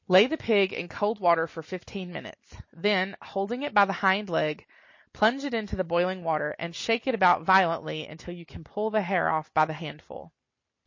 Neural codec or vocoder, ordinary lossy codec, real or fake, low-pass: none; MP3, 32 kbps; real; 7.2 kHz